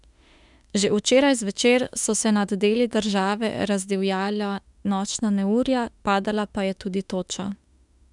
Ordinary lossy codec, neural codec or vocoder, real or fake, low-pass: none; autoencoder, 48 kHz, 32 numbers a frame, DAC-VAE, trained on Japanese speech; fake; 10.8 kHz